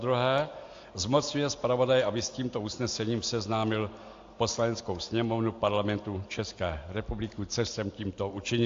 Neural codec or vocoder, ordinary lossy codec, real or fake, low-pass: none; AAC, 48 kbps; real; 7.2 kHz